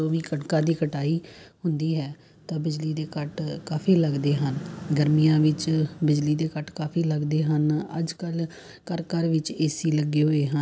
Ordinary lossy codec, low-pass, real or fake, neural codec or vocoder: none; none; real; none